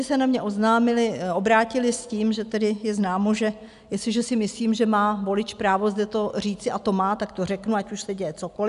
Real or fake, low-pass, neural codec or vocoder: real; 10.8 kHz; none